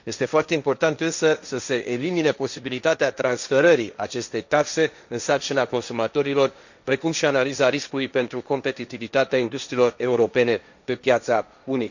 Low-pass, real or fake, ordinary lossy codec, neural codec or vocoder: 7.2 kHz; fake; none; codec, 16 kHz, 1.1 kbps, Voila-Tokenizer